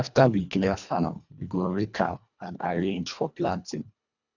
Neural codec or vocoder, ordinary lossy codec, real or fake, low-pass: codec, 24 kHz, 1.5 kbps, HILCodec; none; fake; 7.2 kHz